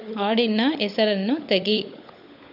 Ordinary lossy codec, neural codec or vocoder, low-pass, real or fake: none; codec, 16 kHz, 16 kbps, FunCodec, trained on LibriTTS, 50 frames a second; 5.4 kHz; fake